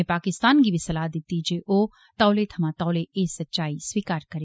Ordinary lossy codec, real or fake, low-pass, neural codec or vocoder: none; real; none; none